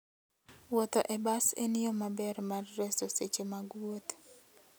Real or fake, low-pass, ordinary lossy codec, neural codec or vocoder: real; none; none; none